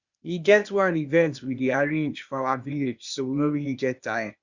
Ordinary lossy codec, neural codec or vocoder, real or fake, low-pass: Opus, 64 kbps; codec, 16 kHz, 0.8 kbps, ZipCodec; fake; 7.2 kHz